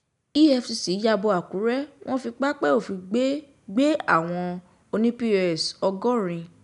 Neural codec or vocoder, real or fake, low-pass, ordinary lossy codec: none; real; 10.8 kHz; none